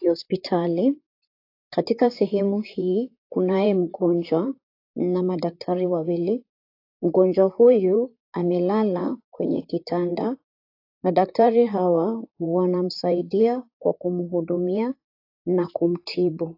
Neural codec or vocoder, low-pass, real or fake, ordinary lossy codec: vocoder, 44.1 kHz, 128 mel bands, Pupu-Vocoder; 5.4 kHz; fake; AAC, 32 kbps